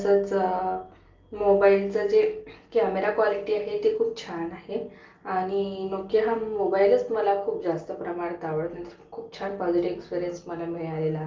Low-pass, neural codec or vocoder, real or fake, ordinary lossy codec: 7.2 kHz; none; real; Opus, 32 kbps